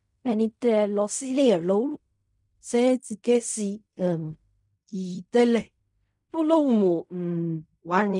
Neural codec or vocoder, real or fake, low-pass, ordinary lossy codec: codec, 16 kHz in and 24 kHz out, 0.4 kbps, LongCat-Audio-Codec, fine tuned four codebook decoder; fake; 10.8 kHz; none